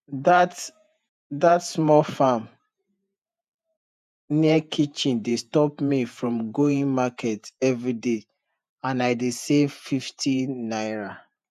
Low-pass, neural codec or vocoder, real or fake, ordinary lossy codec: 14.4 kHz; vocoder, 48 kHz, 128 mel bands, Vocos; fake; none